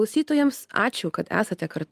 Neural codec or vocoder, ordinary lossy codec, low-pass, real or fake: none; Opus, 32 kbps; 14.4 kHz; real